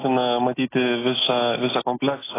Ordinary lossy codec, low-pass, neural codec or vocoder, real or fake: AAC, 16 kbps; 3.6 kHz; none; real